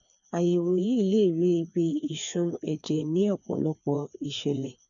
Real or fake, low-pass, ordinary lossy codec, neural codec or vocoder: fake; 7.2 kHz; none; codec, 16 kHz, 2 kbps, FreqCodec, larger model